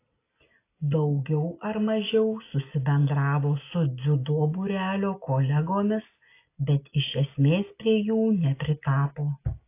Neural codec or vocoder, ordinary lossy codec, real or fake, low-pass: none; AAC, 24 kbps; real; 3.6 kHz